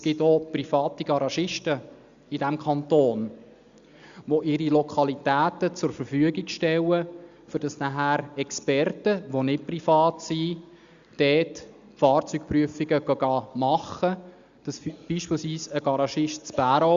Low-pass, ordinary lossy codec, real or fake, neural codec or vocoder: 7.2 kHz; Opus, 64 kbps; real; none